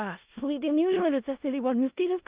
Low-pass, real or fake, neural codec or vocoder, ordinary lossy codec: 3.6 kHz; fake; codec, 16 kHz in and 24 kHz out, 0.4 kbps, LongCat-Audio-Codec, four codebook decoder; Opus, 32 kbps